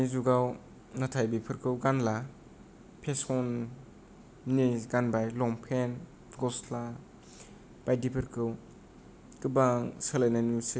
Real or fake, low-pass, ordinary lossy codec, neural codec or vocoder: real; none; none; none